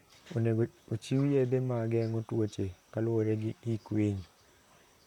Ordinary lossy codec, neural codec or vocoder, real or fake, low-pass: none; vocoder, 44.1 kHz, 128 mel bands, Pupu-Vocoder; fake; 19.8 kHz